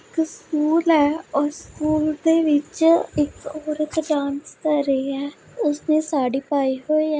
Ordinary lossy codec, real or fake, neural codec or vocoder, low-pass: none; real; none; none